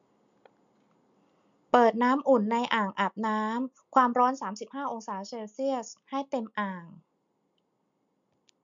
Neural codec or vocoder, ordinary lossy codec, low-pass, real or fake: none; MP3, 64 kbps; 7.2 kHz; real